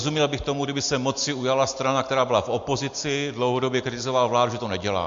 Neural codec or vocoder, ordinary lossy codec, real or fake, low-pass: none; MP3, 48 kbps; real; 7.2 kHz